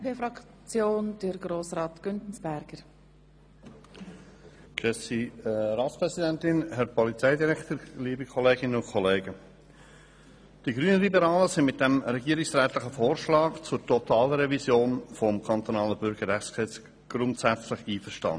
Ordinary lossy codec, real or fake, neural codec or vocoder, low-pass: none; real; none; none